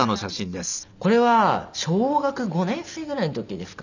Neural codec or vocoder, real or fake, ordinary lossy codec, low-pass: none; real; none; 7.2 kHz